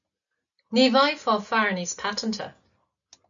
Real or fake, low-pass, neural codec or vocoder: real; 7.2 kHz; none